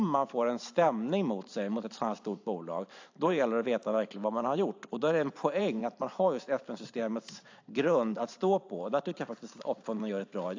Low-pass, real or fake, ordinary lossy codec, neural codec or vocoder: 7.2 kHz; fake; AAC, 48 kbps; vocoder, 44.1 kHz, 128 mel bands every 512 samples, BigVGAN v2